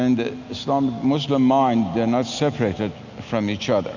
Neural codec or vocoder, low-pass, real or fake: none; 7.2 kHz; real